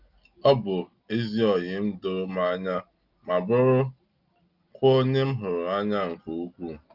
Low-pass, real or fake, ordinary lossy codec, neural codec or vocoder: 5.4 kHz; real; Opus, 32 kbps; none